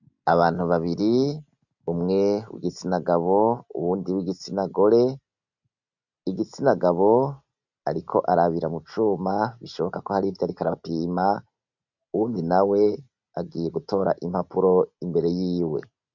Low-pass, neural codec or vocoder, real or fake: 7.2 kHz; none; real